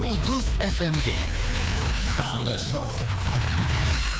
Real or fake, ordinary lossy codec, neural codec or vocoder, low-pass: fake; none; codec, 16 kHz, 2 kbps, FreqCodec, larger model; none